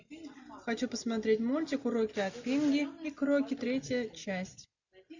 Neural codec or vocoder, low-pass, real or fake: none; 7.2 kHz; real